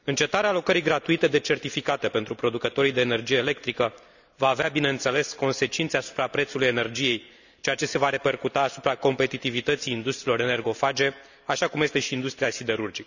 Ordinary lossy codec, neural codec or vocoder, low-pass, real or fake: none; none; 7.2 kHz; real